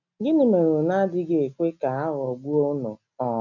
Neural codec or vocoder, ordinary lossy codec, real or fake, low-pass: none; none; real; 7.2 kHz